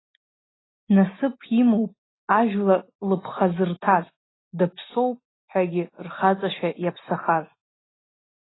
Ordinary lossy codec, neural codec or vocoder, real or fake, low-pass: AAC, 16 kbps; none; real; 7.2 kHz